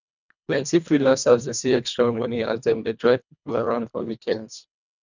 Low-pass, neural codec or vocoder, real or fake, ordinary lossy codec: 7.2 kHz; codec, 24 kHz, 1.5 kbps, HILCodec; fake; none